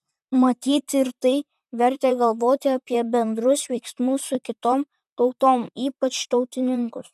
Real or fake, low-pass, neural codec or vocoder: fake; 14.4 kHz; vocoder, 44.1 kHz, 128 mel bands, Pupu-Vocoder